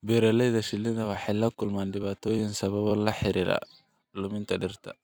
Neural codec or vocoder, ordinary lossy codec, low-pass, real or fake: vocoder, 44.1 kHz, 128 mel bands every 256 samples, BigVGAN v2; none; none; fake